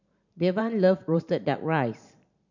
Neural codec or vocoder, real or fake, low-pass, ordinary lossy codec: vocoder, 44.1 kHz, 128 mel bands every 512 samples, BigVGAN v2; fake; 7.2 kHz; none